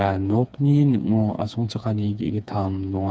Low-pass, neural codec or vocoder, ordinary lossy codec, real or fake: none; codec, 16 kHz, 4 kbps, FreqCodec, smaller model; none; fake